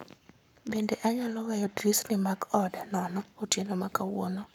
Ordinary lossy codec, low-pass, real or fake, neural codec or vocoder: none; 19.8 kHz; fake; codec, 44.1 kHz, 7.8 kbps, Pupu-Codec